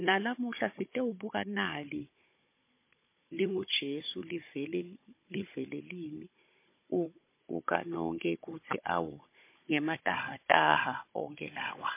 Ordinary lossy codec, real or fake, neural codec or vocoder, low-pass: MP3, 24 kbps; fake; codec, 16 kHz, 16 kbps, FunCodec, trained on Chinese and English, 50 frames a second; 3.6 kHz